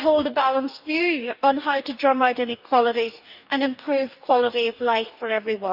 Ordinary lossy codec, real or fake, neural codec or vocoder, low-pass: none; fake; codec, 44.1 kHz, 2.6 kbps, DAC; 5.4 kHz